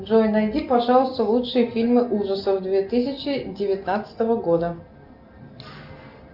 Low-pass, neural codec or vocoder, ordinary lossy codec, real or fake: 5.4 kHz; none; Opus, 64 kbps; real